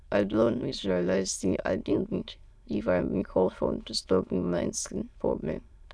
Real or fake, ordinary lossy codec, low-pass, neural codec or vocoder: fake; none; none; autoencoder, 22.05 kHz, a latent of 192 numbers a frame, VITS, trained on many speakers